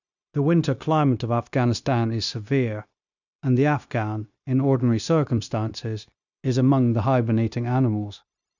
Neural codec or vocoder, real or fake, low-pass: codec, 16 kHz, 0.9 kbps, LongCat-Audio-Codec; fake; 7.2 kHz